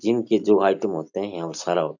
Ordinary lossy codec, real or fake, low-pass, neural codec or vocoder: none; real; 7.2 kHz; none